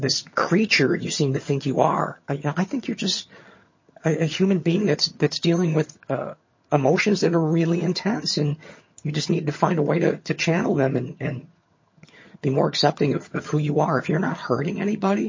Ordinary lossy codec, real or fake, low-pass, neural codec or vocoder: MP3, 32 kbps; fake; 7.2 kHz; vocoder, 22.05 kHz, 80 mel bands, HiFi-GAN